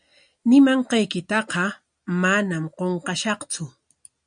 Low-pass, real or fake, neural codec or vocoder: 9.9 kHz; real; none